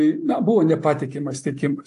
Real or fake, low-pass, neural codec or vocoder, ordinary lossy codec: real; 10.8 kHz; none; AAC, 48 kbps